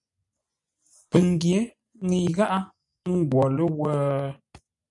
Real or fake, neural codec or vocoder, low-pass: fake; vocoder, 24 kHz, 100 mel bands, Vocos; 10.8 kHz